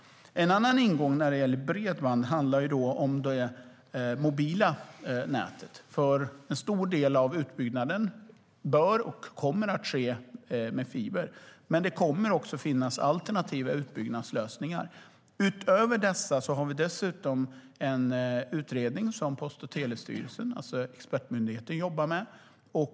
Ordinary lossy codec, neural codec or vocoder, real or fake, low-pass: none; none; real; none